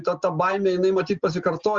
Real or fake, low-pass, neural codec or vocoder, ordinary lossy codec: real; 7.2 kHz; none; Opus, 32 kbps